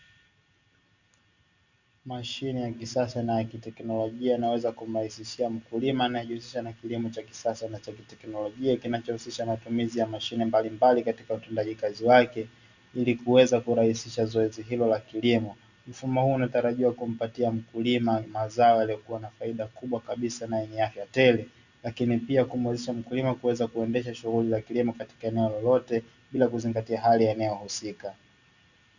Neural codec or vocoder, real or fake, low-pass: none; real; 7.2 kHz